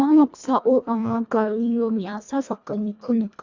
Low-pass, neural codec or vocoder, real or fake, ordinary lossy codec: 7.2 kHz; codec, 24 kHz, 1.5 kbps, HILCodec; fake; Opus, 64 kbps